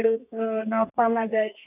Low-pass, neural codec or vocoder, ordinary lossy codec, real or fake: 3.6 kHz; codec, 32 kHz, 1.9 kbps, SNAC; none; fake